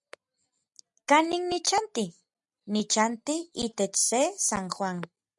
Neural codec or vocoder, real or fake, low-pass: none; real; 10.8 kHz